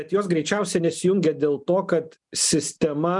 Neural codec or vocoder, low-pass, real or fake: none; 10.8 kHz; real